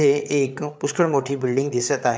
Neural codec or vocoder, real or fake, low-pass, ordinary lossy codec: codec, 16 kHz, 8 kbps, FreqCodec, larger model; fake; none; none